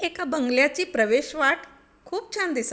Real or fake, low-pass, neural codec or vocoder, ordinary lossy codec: real; none; none; none